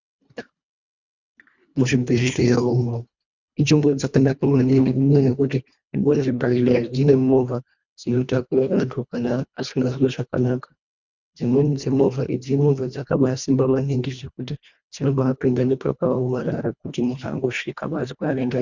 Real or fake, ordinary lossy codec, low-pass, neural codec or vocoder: fake; Opus, 64 kbps; 7.2 kHz; codec, 24 kHz, 1.5 kbps, HILCodec